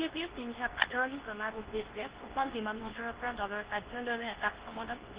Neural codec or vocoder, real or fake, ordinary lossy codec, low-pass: codec, 24 kHz, 0.9 kbps, WavTokenizer, medium speech release version 2; fake; Opus, 16 kbps; 3.6 kHz